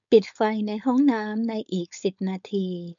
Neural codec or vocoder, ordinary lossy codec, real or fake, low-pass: codec, 16 kHz, 4.8 kbps, FACodec; none; fake; 7.2 kHz